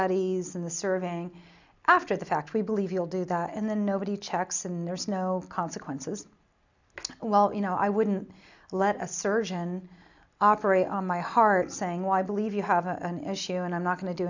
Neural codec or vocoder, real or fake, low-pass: none; real; 7.2 kHz